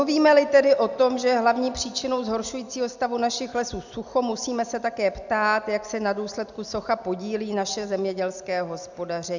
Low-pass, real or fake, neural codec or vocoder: 7.2 kHz; real; none